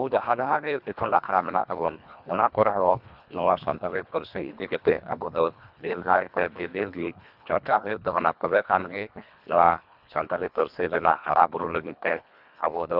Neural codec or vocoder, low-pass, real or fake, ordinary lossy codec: codec, 24 kHz, 1.5 kbps, HILCodec; 5.4 kHz; fake; none